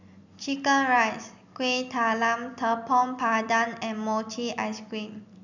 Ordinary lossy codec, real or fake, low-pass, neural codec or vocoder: none; real; 7.2 kHz; none